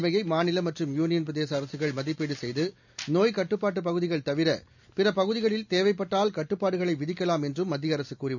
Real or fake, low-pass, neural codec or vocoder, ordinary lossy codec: real; 7.2 kHz; none; none